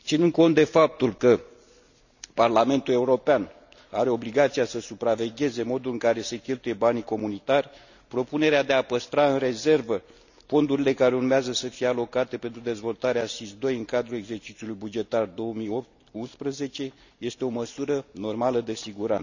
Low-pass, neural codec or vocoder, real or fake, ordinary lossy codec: 7.2 kHz; none; real; none